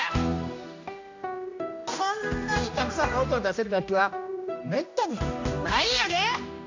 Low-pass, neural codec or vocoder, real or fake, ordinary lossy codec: 7.2 kHz; codec, 16 kHz, 1 kbps, X-Codec, HuBERT features, trained on general audio; fake; AAC, 48 kbps